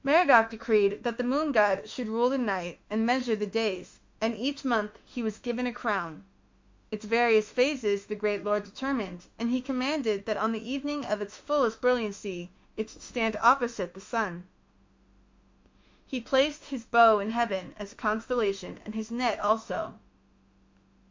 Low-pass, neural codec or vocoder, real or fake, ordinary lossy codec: 7.2 kHz; autoencoder, 48 kHz, 32 numbers a frame, DAC-VAE, trained on Japanese speech; fake; MP3, 48 kbps